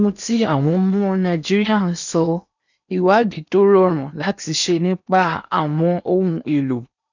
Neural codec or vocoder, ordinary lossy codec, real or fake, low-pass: codec, 16 kHz in and 24 kHz out, 0.8 kbps, FocalCodec, streaming, 65536 codes; none; fake; 7.2 kHz